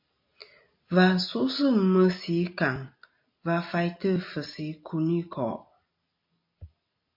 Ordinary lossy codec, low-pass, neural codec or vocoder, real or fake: MP3, 32 kbps; 5.4 kHz; none; real